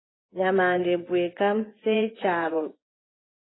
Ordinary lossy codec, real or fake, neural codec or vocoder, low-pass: AAC, 16 kbps; fake; vocoder, 24 kHz, 100 mel bands, Vocos; 7.2 kHz